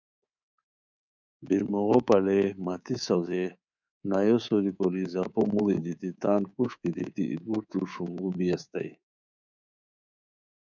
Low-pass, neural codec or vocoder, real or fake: 7.2 kHz; codec, 24 kHz, 3.1 kbps, DualCodec; fake